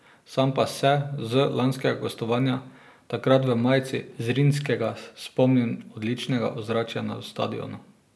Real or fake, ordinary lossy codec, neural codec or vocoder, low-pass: real; none; none; none